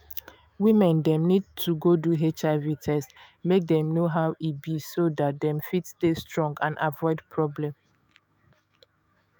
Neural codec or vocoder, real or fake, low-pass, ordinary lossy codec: autoencoder, 48 kHz, 128 numbers a frame, DAC-VAE, trained on Japanese speech; fake; none; none